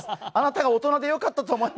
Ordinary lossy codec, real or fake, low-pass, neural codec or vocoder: none; real; none; none